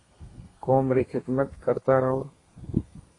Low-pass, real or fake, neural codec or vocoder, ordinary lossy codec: 10.8 kHz; fake; codec, 32 kHz, 1.9 kbps, SNAC; AAC, 32 kbps